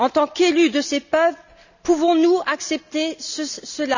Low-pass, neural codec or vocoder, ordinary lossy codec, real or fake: 7.2 kHz; none; none; real